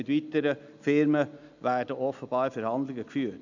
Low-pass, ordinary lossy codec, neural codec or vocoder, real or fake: 7.2 kHz; none; none; real